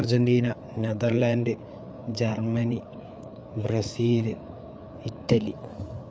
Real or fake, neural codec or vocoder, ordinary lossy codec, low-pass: fake; codec, 16 kHz, 4 kbps, FreqCodec, larger model; none; none